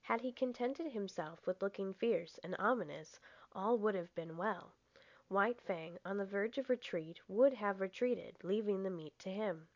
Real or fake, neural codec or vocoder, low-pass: real; none; 7.2 kHz